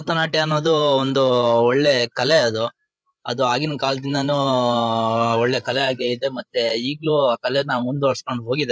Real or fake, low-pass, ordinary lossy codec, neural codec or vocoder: fake; none; none; codec, 16 kHz, 8 kbps, FreqCodec, larger model